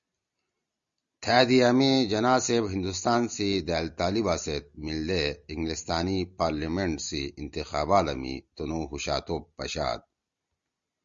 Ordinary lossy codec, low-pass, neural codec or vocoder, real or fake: Opus, 64 kbps; 7.2 kHz; none; real